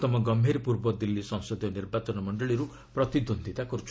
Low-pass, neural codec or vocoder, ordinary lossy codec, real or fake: none; none; none; real